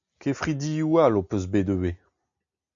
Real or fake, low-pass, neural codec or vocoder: real; 7.2 kHz; none